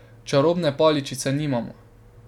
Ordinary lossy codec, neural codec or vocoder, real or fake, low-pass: none; none; real; 19.8 kHz